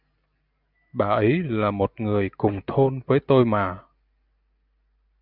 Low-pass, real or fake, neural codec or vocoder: 5.4 kHz; real; none